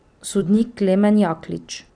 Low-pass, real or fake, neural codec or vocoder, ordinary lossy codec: 9.9 kHz; fake; vocoder, 44.1 kHz, 128 mel bands every 256 samples, BigVGAN v2; none